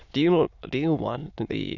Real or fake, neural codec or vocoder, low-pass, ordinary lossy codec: fake; autoencoder, 22.05 kHz, a latent of 192 numbers a frame, VITS, trained on many speakers; 7.2 kHz; none